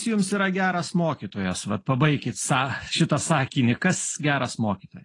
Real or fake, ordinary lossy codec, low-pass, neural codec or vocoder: real; AAC, 32 kbps; 10.8 kHz; none